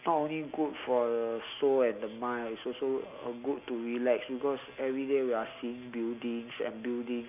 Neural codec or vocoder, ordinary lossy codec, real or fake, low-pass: none; none; real; 3.6 kHz